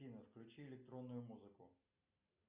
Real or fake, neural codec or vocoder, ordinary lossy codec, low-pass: real; none; Opus, 64 kbps; 3.6 kHz